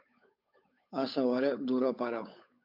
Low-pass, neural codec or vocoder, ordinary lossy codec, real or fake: 5.4 kHz; codec, 16 kHz, 8 kbps, FunCodec, trained on Chinese and English, 25 frames a second; MP3, 48 kbps; fake